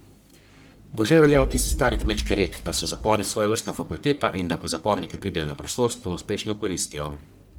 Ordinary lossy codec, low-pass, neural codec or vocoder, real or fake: none; none; codec, 44.1 kHz, 1.7 kbps, Pupu-Codec; fake